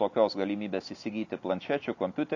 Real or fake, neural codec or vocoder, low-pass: real; none; 7.2 kHz